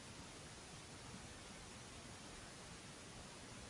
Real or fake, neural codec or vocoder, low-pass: real; none; 10.8 kHz